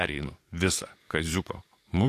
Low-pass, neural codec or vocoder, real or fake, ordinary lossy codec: 14.4 kHz; codec, 44.1 kHz, 7.8 kbps, DAC; fake; AAC, 64 kbps